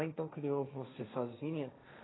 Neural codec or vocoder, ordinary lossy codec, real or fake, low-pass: codec, 16 kHz, 1.1 kbps, Voila-Tokenizer; AAC, 16 kbps; fake; 7.2 kHz